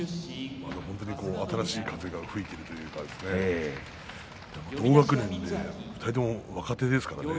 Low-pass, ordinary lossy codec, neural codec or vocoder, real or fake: none; none; none; real